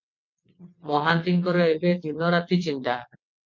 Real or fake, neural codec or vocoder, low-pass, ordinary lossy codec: fake; vocoder, 22.05 kHz, 80 mel bands, WaveNeXt; 7.2 kHz; MP3, 48 kbps